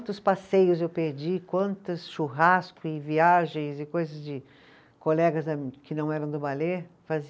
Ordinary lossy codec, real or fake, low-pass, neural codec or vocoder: none; real; none; none